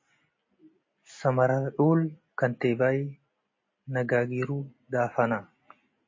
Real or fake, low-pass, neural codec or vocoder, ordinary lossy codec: real; 7.2 kHz; none; MP3, 32 kbps